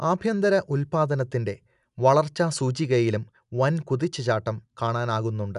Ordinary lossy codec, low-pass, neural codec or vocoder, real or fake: none; 10.8 kHz; none; real